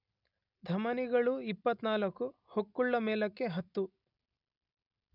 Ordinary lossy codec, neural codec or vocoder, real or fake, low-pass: none; none; real; 5.4 kHz